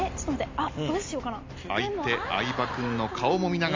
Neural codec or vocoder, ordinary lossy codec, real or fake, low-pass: none; MP3, 48 kbps; real; 7.2 kHz